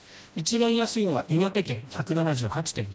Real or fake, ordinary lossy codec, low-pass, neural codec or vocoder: fake; none; none; codec, 16 kHz, 1 kbps, FreqCodec, smaller model